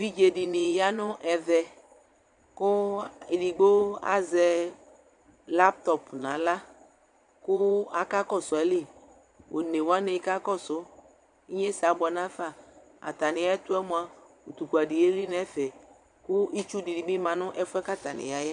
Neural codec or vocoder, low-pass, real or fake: vocoder, 22.05 kHz, 80 mel bands, Vocos; 9.9 kHz; fake